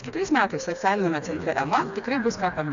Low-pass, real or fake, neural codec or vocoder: 7.2 kHz; fake; codec, 16 kHz, 2 kbps, FreqCodec, smaller model